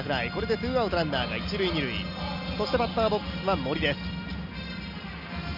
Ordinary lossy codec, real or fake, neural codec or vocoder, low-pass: none; real; none; 5.4 kHz